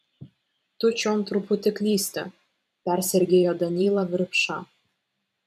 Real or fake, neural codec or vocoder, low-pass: fake; vocoder, 44.1 kHz, 128 mel bands every 512 samples, BigVGAN v2; 14.4 kHz